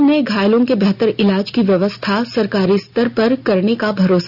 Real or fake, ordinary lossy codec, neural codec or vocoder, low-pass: real; none; none; 5.4 kHz